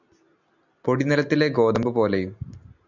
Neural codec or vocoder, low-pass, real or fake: none; 7.2 kHz; real